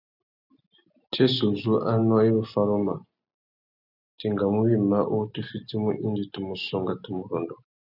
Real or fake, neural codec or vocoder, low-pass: real; none; 5.4 kHz